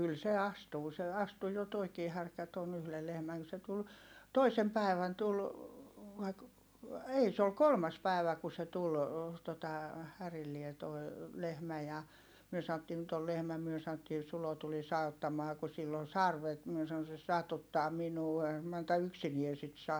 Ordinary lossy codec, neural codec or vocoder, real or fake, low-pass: none; none; real; none